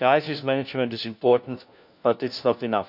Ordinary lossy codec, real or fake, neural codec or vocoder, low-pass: none; fake; codec, 16 kHz, 1 kbps, FunCodec, trained on LibriTTS, 50 frames a second; 5.4 kHz